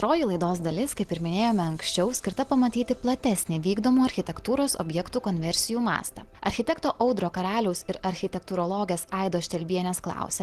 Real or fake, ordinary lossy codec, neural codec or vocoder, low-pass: real; Opus, 16 kbps; none; 14.4 kHz